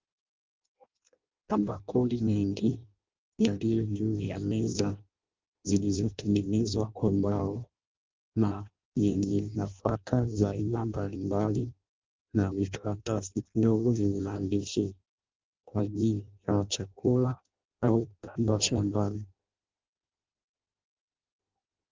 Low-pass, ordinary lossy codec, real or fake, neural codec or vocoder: 7.2 kHz; Opus, 32 kbps; fake; codec, 16 kHz in and 24 kHz out, 0.6 kbps, FireRedTTS-2 codec